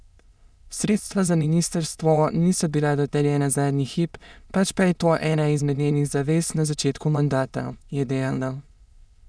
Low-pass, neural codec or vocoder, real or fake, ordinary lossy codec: none; autoencoder, 22.05 kHz, a latent of 192 numbers a frame, VITS, trained on many speakers; fake; none